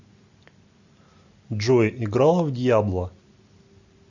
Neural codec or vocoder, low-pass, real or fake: none; 7.2 kHz; real